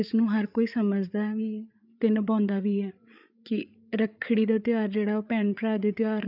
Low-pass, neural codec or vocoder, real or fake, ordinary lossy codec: 5.4 kHz; codec, 16 kHz, 8 kbps, FreqCodec, larger model; fake; none